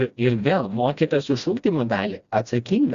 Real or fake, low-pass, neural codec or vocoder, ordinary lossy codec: fake; 7.2 kHz; codec, 16 kHz, 1 kbps, FreqCodec, smaller model; Opus, 64 kbps